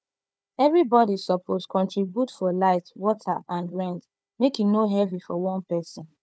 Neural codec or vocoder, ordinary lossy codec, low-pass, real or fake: codec, 16 kHz, 4 kbps, FunCodec, trained on Chinese and English, 50 frames a second; none; none; fake